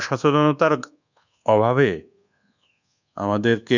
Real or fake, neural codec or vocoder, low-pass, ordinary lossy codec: fake; codec, 24 kHz, 1.2 kbps, DualCodec; 7.2 kHz; none